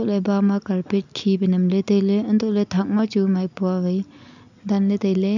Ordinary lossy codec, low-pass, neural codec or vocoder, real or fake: none; 7.2 kHz; none; real